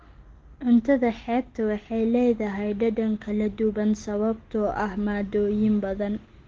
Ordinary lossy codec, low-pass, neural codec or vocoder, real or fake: Opus, 16 kbps; 7.2 kHz; none; real